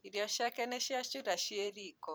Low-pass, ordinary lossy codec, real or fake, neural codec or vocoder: none; none; fake; vocoder, 44.1 kHz, 128 mel bands every 512 samples, BigVGAN v2